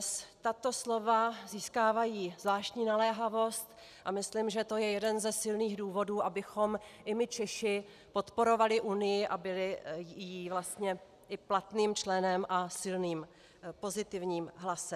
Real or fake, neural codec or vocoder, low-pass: real; none; 14.4 kHz